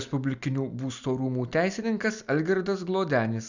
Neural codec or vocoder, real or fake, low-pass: none; real; 7.2 kHz